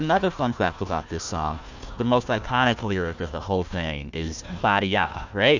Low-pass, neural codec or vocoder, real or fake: 7.2 kHz; codec, 16 kHz, 1 kbps, FunCodec, trained on Chinese and English, 50 frames a second; fake